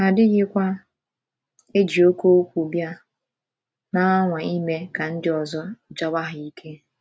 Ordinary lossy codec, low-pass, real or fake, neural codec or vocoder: none; none; real; none